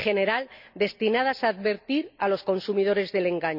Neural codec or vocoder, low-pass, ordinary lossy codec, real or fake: none; 5.4 kHz; none; real